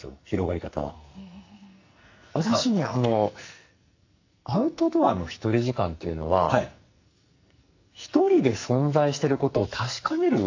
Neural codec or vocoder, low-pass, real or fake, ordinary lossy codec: codec, 44.1 kHz, 2.6 kbps, SNAC; 7.2 kHz; fake; AAC, 48 kbps